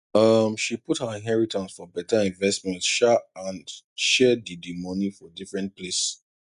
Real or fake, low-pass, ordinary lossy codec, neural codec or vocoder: real; 10.8 kHz; none; none